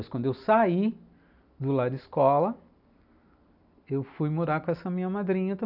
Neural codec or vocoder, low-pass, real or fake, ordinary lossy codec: none; 5.4 kHz; real; none